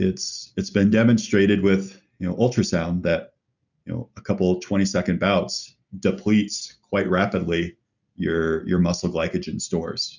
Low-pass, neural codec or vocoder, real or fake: 7.2 kHz; none; real